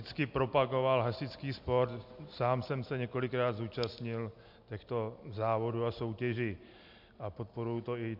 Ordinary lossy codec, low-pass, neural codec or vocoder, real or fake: MP3, 48 kbps; 5.4 kHz; none; real